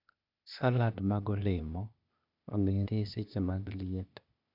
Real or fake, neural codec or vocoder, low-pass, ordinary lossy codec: fake; codec, 16 kHz, 0.8 kbps, ZipCodec; 5.4 kHz; none